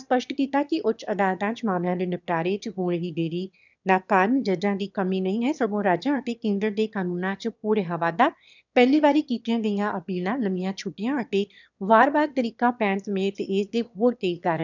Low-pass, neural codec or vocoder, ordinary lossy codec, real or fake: 7.2 kHz; autoencoder, 22.05 kHz, a latent of 192 numbers a frame, VITS, trained on one speaker; none; fake